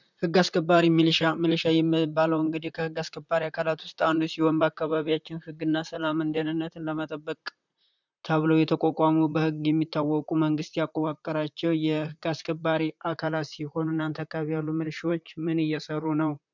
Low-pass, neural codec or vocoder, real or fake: 7.2 kHz; vocoder, 44.1 kHz, 128 mel bands, Pupu-Vocoder; fake